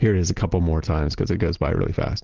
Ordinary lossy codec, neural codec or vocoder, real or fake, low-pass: Opus, 16 kbps; none; real; 7.2 kHz